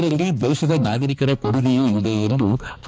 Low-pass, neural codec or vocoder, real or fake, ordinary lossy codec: none; codec, 16 kHz, 2 kbps, X-Codec, HuBERT features, trained on balanced general audio; fake; none